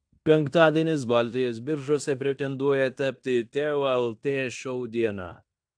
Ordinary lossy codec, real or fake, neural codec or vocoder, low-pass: AAC, 64 kbps; fake; codec, 16 kHz in and 24 kHz out, 0.9 kbps, LongCat-Audio-Codec, fine tuned four codebook decoder; 9.9 kHz